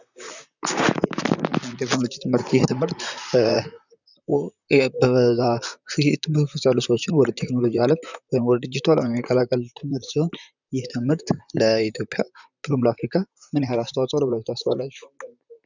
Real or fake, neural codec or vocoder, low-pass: fake; vocoder, 44.1 kHz, 128 mel bands, Pupu-Vocoder; 7.2 kHz